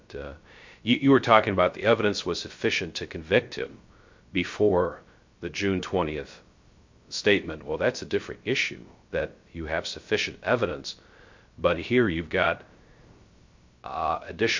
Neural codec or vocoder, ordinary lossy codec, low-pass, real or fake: codec, 16 kHz, 0.3 kbps, FocalCodec; MP3, 48 kbps; 7.2 kHz; fake